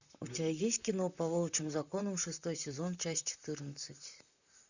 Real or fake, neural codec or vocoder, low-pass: fake; vocoder, 44.1 kHz, 128 mel bands, Pupu-Vocoder; 7.2 kHz